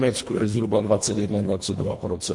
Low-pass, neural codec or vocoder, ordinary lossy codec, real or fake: 10.8 kHz; codec, 24 kHz, 1.5 kbps, HILCodec; MP3, 48 kbps; fake